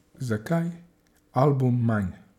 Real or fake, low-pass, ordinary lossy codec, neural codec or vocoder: real; 19.8 kHz; none; none